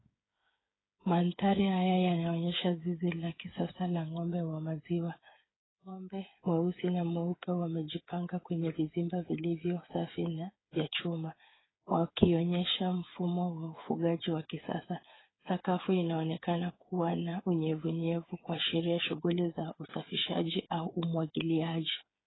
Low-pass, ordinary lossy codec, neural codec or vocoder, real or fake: 7.2 kHz; AAC, 16 kbps; codec, 16 kHz, 8 kbps, FreqCodec, smaller model; fake